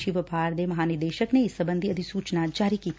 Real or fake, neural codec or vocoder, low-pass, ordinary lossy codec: real; none; none; none